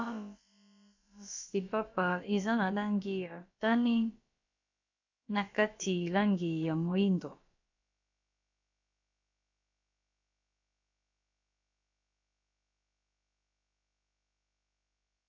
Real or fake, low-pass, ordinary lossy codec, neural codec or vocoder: fake; 7.2 kHz; AAC, 48 kbps; codec, 16 kHz, about 1 kbps, DyCAST, with the encoder's durations